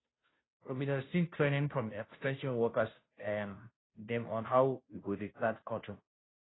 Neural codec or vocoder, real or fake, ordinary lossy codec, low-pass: codec, 16 kHz, 0.5 kbps, FunCodec, trained on Chinese and English, 25 frames a second; fake; AAC, 16 kbps; 7.2 kHz